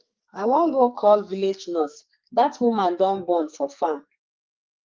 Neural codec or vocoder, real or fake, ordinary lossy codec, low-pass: codec, 44.1 kHz, 2.6 kbps, SNAC; fake; Opus, 24 kbps; 7.2 kHz